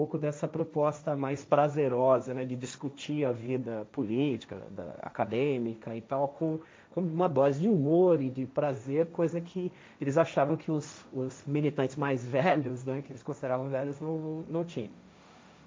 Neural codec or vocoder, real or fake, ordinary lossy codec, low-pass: codec, 16 kHz, 1.1 kbps, Voila-Tokenizer; fake; none; none